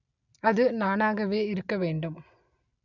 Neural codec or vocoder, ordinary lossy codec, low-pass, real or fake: none; none; 7.2 kHz; real